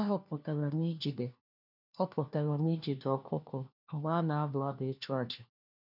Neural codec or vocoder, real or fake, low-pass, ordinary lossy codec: codec, 16 kHz, 1 kbps, FunCodec, trained on LibriTTS, 50 frames a second; fake; 5.4 kHz; none